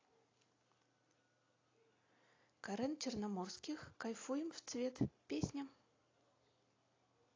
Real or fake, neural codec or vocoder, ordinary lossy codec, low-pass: real; none; AAC, 32 kbps; 7.2 kHz